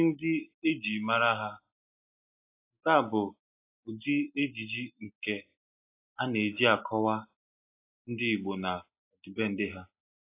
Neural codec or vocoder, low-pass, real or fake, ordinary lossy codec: none; 3.6 kHz; real; AAC, 24 kbps